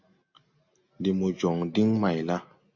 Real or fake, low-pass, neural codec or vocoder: real; 7.2 kHz; none